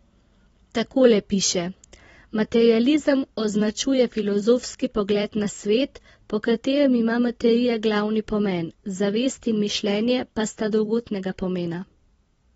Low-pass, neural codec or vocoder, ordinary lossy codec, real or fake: 19.8 kHz; vocoder, 44.1 kHz, 128 mel bands every 256 samples, BigVGAN v2; AAC, 24 kbps; fake